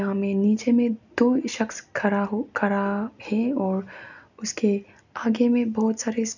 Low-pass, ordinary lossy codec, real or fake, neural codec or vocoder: 7.2 kHz; none; real; none